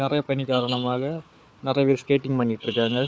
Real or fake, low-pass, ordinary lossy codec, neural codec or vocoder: fake; none; none; codec, 16 kHz, 4 kbps, FunCodec, trained on Chinese and English, 50 frames a second